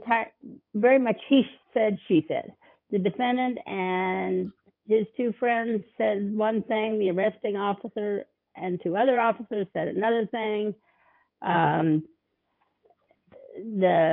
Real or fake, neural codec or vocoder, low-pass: fake; codec, 16 kHz in and 24 kHz out, 2.2 kbps, FireRedTTS-2 codec; 5.4 kHz